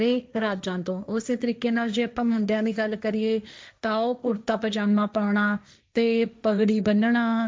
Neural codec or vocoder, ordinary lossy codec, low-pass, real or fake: codec, 16 kHz, 1.1 kbps, Voila-Tokenizer; none; none; fake